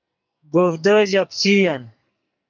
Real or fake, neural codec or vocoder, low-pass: fake; codec, 44.1 kHz, 2.6 kbps, SNAC; 7.2 kHz